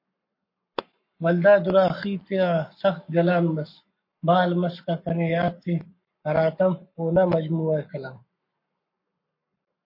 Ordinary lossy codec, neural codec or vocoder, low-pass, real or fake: MP3, 32 kbps; codec, 44.1 kHz, 7.8 kbps, Pupu-Codec; 5.4 kHz; fake